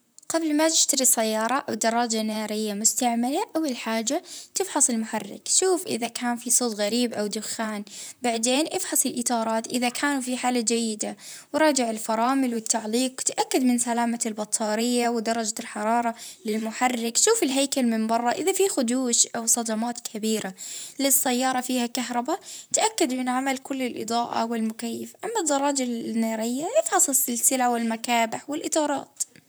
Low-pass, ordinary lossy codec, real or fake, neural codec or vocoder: none; none; fake; vocoder, 44.1 kHz, 128 mel bands, Pupu-Vocoder